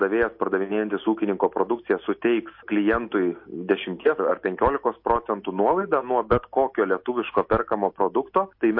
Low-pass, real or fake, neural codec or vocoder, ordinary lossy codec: 5.4 kHz; real; none; MP3, 32 kbps